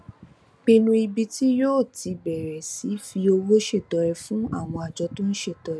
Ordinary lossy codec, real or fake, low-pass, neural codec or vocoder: none; real; none; none